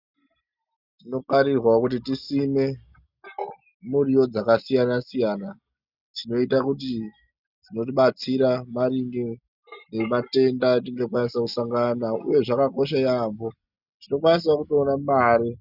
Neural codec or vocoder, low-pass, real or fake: none; 5.4 kHz; real